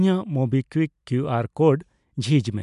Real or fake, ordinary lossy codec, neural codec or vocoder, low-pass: real; MP3, 96 kbps; none; 10.8 kHz